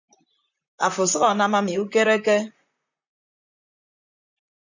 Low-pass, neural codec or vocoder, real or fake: 7.2 kHz; vocoder, 44.1 kHz, 128 mel bands, Pupu-Vocoder; fake